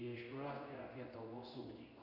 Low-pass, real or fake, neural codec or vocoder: 5.4 kHz; fake; codec, 16 kHz in and 24 kHz out, 1 kbps, XY-Tokenizer